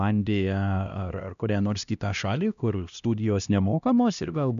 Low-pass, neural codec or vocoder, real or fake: 7.2 kHz; codec, 16 kHz, 1 kbps, X-Codec, HuBERT features, trained on LibriSpeech; fake